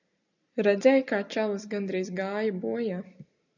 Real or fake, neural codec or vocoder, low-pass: real; none; 7.2 kHz